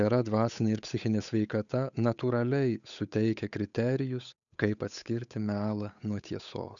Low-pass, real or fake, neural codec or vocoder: 7.2 kHz; fake; codec, 16 kHz, 8 kbps, FunCodec, trained on Chinese and English, 25 frames a second